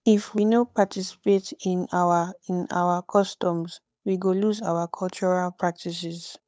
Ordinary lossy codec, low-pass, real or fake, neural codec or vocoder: none; none; fake; codec, 16 kHz, 8 kbps, FunCodec, trained on Chinese and English, 25 frames a second